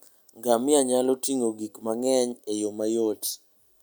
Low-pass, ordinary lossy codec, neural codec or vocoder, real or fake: none; none; none; real